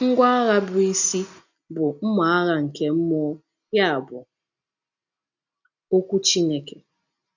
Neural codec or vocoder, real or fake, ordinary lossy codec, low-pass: none; real; none; 7.2 kHz